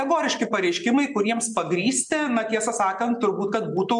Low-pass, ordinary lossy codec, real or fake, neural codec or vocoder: 10.8 kHz; MP3, 96 kbps; real; none